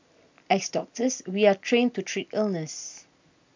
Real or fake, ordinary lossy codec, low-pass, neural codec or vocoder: real; MP3, 64 kbps; 7.2 kHz; none